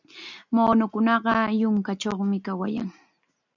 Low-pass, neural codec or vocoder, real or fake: 7.2 kHz; none; real